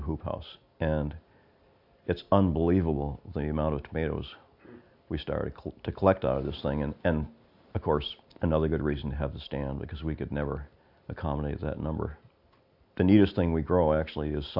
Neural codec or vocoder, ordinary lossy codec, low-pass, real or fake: none; AAC, 48 kbps; 5.4 kHz; real